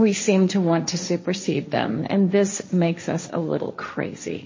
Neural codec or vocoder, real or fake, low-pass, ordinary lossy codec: codec, 16 kHz, 1.1 kbps, Voila-Tokenizer; fake; 7.2 kHz; MP3, 32 kbps